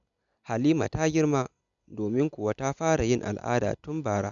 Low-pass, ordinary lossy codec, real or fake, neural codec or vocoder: 7.2 kHz; none; real; none